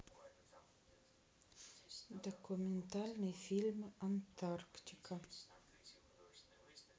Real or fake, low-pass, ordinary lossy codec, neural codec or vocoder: real; none; none; none